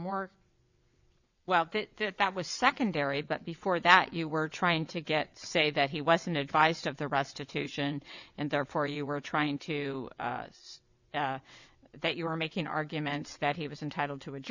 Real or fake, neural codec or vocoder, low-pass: fake; vocoder, 22.05 kHz, 80 mel bands, WaveNeXt; 7.2 kHz